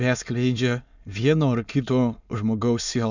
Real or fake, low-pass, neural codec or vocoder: fake; 7.2 kHz; autoencoder, 22.05 kHz, a latent of 192 numbers a frame, VITS, trained on many speakers